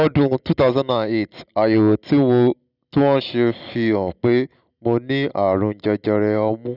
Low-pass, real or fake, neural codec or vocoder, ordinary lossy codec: 5.4 kHz; real; none; none